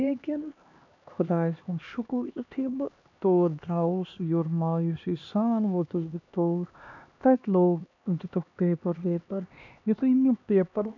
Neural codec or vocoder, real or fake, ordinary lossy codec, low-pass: codec, 16 kHz, 2 kbps, X-Codec, HuBERT features, trained on LibriSpeech; fake; none; 7.2 kHz